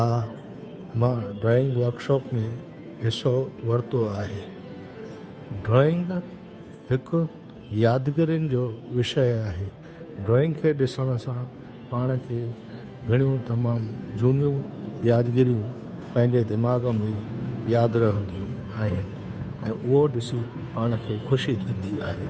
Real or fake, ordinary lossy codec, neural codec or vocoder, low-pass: fake; none; codec, 16 kHz, 2 kbps, FunCodec, trained on Chinese and English, 25 frames a second; none